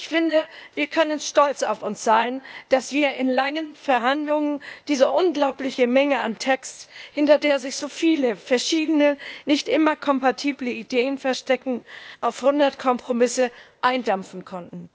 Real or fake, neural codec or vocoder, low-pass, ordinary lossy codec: fake; codec, 16 kHz, 0.8 kbps, ZipCodec; none; none